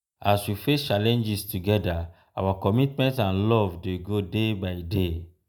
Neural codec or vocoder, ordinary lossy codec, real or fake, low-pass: none; none; real; none